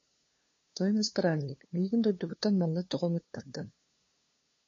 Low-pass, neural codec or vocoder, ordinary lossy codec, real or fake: 7.2 kHz; codec, 16 kHz, 2 kbps, FunCodec, trained on LibriTTS, 25 frames a second; MP3, 32 kbps; fake